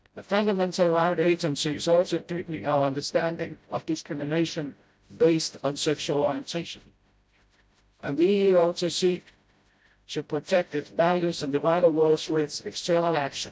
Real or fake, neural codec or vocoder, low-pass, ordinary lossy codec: fake; codec, 16 kHz, 0.5 kbps, FreqCodec, smaller model; none; none